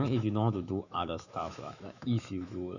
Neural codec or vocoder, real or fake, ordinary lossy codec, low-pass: autoencoder, 48 kHz, 128 numbers a frame, DAC-VAE, trained on Japanese speech; fake; none; 7.2 kHz